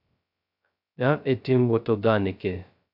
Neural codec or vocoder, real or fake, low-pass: codec, 16 kHz, 0.2 kbps, FocalCodec; fake; 5.4 kHz